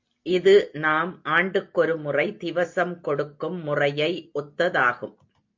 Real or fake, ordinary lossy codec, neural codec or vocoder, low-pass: real; MP3, 48 kbps; none; 7.2 kHz